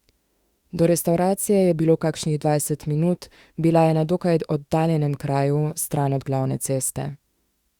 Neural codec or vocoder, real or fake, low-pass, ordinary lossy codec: autoencoder, 48 kHz, 32 numbers a frame, DAC-VAE, trained on Japanese speech; fake; 19.8 kHz; Opus, 64 kbps